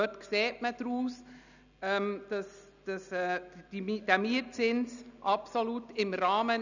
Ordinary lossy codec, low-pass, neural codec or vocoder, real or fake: none; 7.2 kHz; none; real